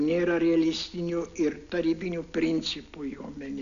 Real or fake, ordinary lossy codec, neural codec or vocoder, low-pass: real; MP3, 96 kbps; none; 7.2 kHz